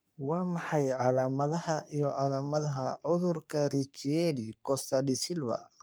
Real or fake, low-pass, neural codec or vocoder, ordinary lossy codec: fake; none; codec, 44.1 kHz, 3.4 kbps, Pupu-Codec; none